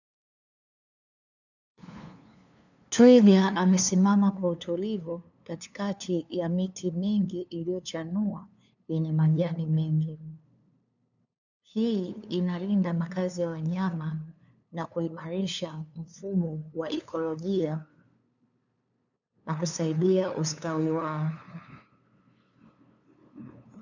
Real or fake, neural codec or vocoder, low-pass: fake; codec, 16 kHz, 2 kbps, FunCodec, trained on LibriTTS, 25 frames a second; 7.2 kHz